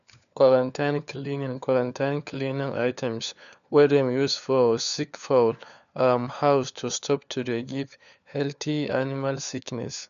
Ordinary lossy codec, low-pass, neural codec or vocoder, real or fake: none; 7.2 kHz; codec, 16 kHz, 4 kbps, FunCodec, trained on LibriTTS, 50 frames a second; fake